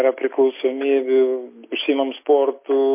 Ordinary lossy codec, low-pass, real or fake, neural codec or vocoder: MP3, 24 kbps; 3.6 kHz; real; none